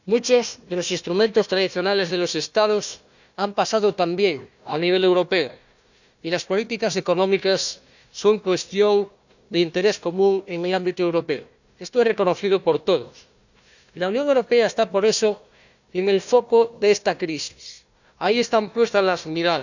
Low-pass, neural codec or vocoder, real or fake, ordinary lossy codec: 7.2 kHz; codec, 16 kHz, 1 kbps, FunCodec, trained on Chinese and English, 50 frames a second; fake; none